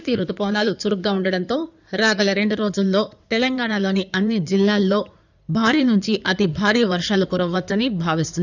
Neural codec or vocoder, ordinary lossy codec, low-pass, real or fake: codec, 16 kHz in and 24 kHz out, 2.2 kbps, FireRedTTS-2 codec; none; 7.2 kHz; fake